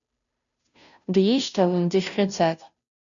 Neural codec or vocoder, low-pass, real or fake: codec, 16 kHz, 0.5 kbps, FunCodec, trained on Chinese and English, 25 frames a second; 7.2 kHz; fake